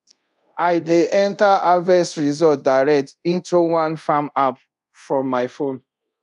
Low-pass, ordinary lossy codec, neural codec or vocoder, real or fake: 10.8 kHz; none; codec, 24 kHz, 0.5 kbps, DualCodec; fake